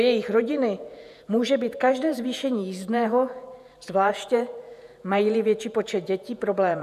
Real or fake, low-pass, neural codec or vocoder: fake; 14.4 kHz; vocoder, 48 kHz, 128 mel bands, Vocos